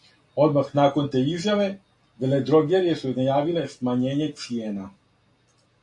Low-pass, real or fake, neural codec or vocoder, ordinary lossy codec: 10.8 kHz; real; none; AAC, 48 kbps